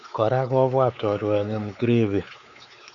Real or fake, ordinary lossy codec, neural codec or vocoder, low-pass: fake; none; codec, 16 kHz, 4 kbps, X-Codec, WavLM features, trained on Multilingual LibriSpeech; 7.2 kHz